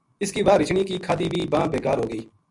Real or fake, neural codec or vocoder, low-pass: real; none; 10.8 kHz